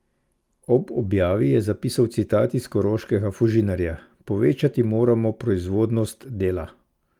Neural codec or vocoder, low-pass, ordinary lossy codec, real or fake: none; 19.8 kHz; Opus, 32 kbps; real